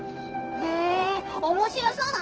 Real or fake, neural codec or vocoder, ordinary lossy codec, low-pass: fake; codec, 16 kHz, 0.9 kbps, LongCat-Audio-Codec; Opus, 16 kbps; 7.2 kHz